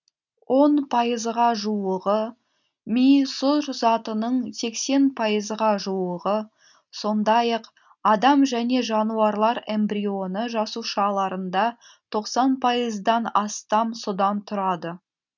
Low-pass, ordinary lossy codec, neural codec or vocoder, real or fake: 7.2 kHz; none; none; real